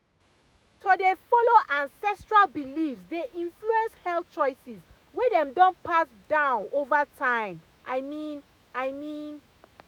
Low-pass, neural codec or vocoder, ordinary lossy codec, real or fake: none; autoencoder, 48 kHz, 128 numbers a frame, DAC-VAE, trained on Japanese speech; none; fake